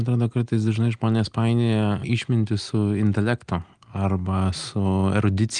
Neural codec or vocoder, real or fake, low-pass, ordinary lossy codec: none; real; 9.9 kHz; Opus, 24 kbps